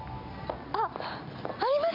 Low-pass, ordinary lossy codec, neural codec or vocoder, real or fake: 5.4 kHz; MP3, 48 kbps; none; real